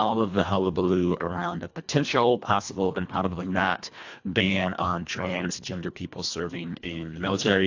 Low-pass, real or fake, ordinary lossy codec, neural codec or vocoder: 7.2 kHz; fake; AAC, 48 kbps; codec, 24 kHz, 1.5 kbps, HILCodec